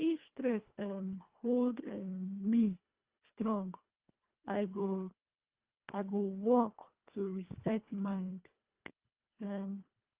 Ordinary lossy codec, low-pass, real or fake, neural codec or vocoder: Opus, 32 kbps; 3.6 kHz; fake; codec, 24 kHz, 1.5 kbps, HILCodec